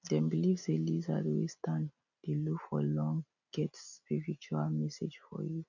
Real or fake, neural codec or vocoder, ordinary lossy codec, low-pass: real; none; none; 7.2 kHz